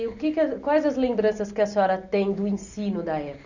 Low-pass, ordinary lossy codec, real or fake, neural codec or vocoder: 7.2 kHz; none; real; none